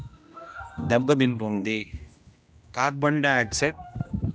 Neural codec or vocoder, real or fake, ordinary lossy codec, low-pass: codec, 16 kHz, 1 kbps, X-Codec, HuBERT features, trained on general audio; fake; none; none